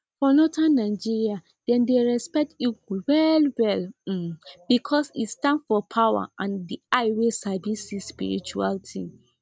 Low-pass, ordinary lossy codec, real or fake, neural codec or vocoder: none; none; real; none